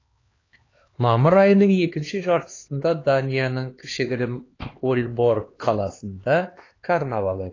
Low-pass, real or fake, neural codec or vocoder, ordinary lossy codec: 7.2 kHz; fake; codec, 16 kHz, 2 kbps, X-Codec, HuBERT features, trained on LibriSpeech; AAC, 32 kbps